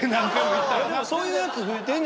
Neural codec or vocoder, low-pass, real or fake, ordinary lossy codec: none; none; real; none